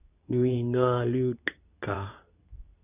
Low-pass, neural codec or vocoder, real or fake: 3.6 kHz; codec, 24 kHz, 0.9 kbps, WavTokenizer, medium speech release version 2; fake